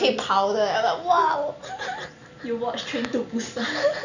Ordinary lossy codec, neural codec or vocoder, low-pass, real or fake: none; none; 7.2 kHz; real